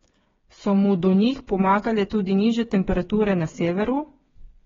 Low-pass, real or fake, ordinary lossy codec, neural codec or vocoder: 7.2 kHz; fake; AAC, 24 kbps; codec, 16 kHz, 8 kbps, FreqCodec, smaller model